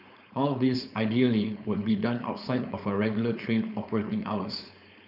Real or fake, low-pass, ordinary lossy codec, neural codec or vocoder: fake; 5.4 kHz; none; codec, 16 kHz, 4.8 kbps, FACodec